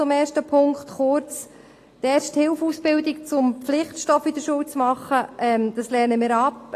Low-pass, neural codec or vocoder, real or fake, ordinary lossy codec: 14.4 kHz; none; real; AAC, 48 kbps